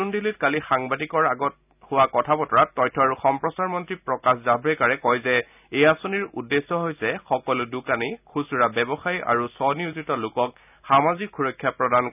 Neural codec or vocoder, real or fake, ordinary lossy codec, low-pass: none; real; none; 3.6 kHz